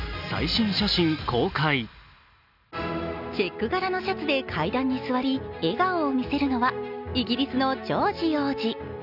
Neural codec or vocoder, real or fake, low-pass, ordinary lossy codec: none; real; 5.4 kHz; none